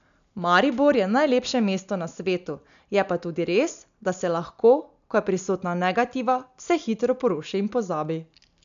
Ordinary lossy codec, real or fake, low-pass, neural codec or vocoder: none; real; 7.2 kHz; none